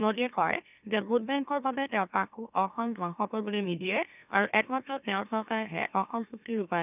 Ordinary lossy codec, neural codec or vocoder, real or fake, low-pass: none; autoencoder, 44.1 kHz, a latent of 192 numbers a frame, MeloTTS; fake; 3.6 kHz